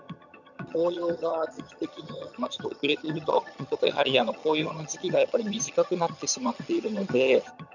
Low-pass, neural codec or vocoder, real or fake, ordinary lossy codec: 7.2 kHz; vocoder, 22.05 kHz, 80 mel bands, HiFi-GAN; fake; none